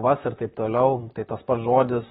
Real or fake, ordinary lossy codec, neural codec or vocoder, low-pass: fake; AAC, 16 kbps; vocoder, 44.1 kHz, 128 mel bands, Pupu-Vocoder; 19.8 kHz